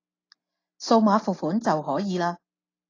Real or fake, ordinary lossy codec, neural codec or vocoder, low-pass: real; AAC, 32 kbps; none; 7.2 kHz